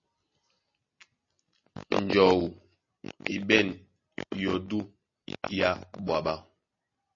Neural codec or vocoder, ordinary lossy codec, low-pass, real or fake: none; MP3, 32 kbps; 7.2 kHz; real